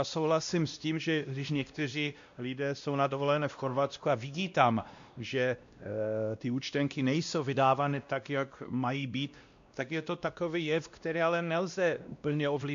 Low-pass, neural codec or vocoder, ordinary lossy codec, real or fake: 7.2 kHz; codec, 16 kHz, 1 kbps, X-Codec, WavLM features, trained on Multilingual LibriSpeech; MP3, 64 kbps; fake